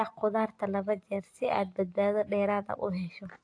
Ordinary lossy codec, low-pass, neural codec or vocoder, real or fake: AAC, 48 kbps; 9.9 kHz; none; real